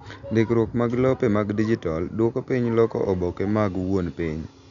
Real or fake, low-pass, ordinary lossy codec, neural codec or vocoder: real; 7.2 kHz; none; none